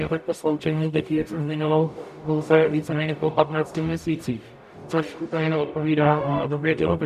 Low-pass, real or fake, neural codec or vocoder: 14.4 kHz; fake; codec, 44.1 kHz, 0.9 kbps, DAC